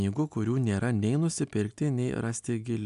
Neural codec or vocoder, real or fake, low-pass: none; real; 10.8 kHz